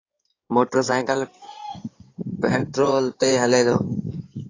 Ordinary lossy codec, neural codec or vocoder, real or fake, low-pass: AAC, 32 kbps; codec, 16 kHz in and 24 kHz out, 2.2 kbps, FireRedTTS-2 codec; fake; 7.2 kHz